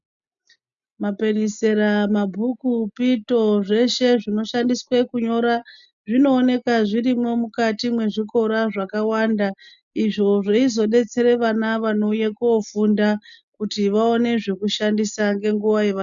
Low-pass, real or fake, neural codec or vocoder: 7.2 kHz; real; none